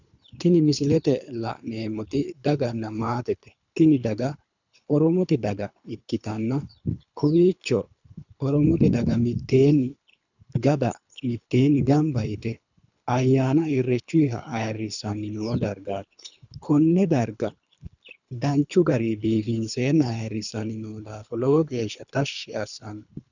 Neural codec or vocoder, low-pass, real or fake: codec, 24 kHz, 3 kbps, HILCodec; 7.2 kHz; fake